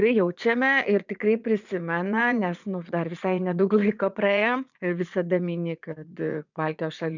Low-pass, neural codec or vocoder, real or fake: 7.2 kHz; vocoder, 44.1 kHz, 80 mel bands, Vocos; fake